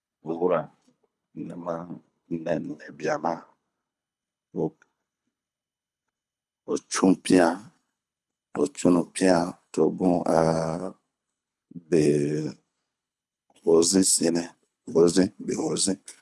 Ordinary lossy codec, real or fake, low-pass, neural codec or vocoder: none; fake; none; codec, 24 kHz, 6 kbps, HILCodec